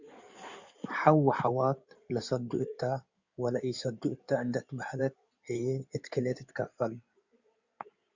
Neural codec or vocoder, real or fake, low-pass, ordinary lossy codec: codec, 16 kHz in and 24 kHz out, 2.2 kbps, FireRedTTS-2 codec; fake; 7.2 kHz; Opus, 64 kbps